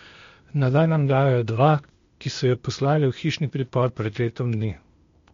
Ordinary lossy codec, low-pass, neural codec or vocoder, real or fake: MP3, 48 kbps; 7.2 kHz; codec, 16 kHz, 0.8 kbps, ZipCodec; fake